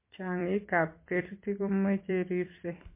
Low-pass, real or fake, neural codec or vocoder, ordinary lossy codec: 3.6 kHz; fake; vocoder, 44.1 kHz, 128 mel bands every 256 samples, BigVGAN v2; MP3, 24 kbps